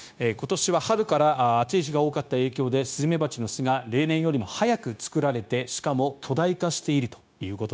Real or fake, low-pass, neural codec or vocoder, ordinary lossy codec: fake; none; codec, 16 kHz, 0.9 kbps, LongCat-Audio-Codec; none